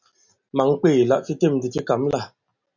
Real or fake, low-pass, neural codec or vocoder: real; 7.2 kHz; none